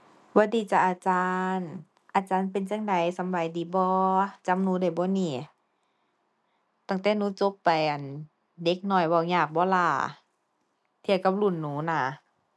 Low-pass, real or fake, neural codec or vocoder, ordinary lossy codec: none; real; none; none